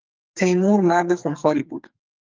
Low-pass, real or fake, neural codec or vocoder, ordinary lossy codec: 7.2 kHz; fake; codec, 44.1 kHz, 2.6 kbps, SNAC; Opus, 24 kbps